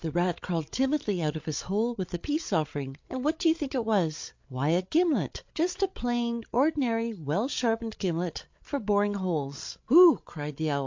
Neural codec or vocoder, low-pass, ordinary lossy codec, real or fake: codec, 16 kHz, 8 kbps, FreqCodec, larger model; 7.2 kHz; MP3, 48 kbps; fake